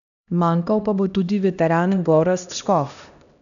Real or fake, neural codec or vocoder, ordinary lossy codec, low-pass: fake; codec, 16 kHz, 1 kbps, X-Codec, HuBERT features, trained on LibriSpeech; none; 7.2 kHz